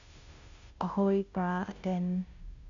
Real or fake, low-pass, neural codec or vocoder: fake; 7.2 kHz; codec, 16 kHz, 0.5 kbps, FunCodec, trained on Chinese and English, 25 frames a second